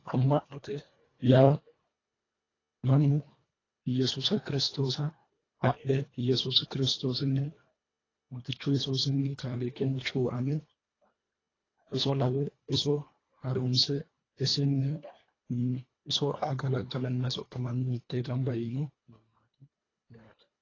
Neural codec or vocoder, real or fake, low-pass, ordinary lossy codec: codec, 24 kHz, 1.5 kbps, HILCodec; fake; 7.2 kHz; AAC, 32 kbps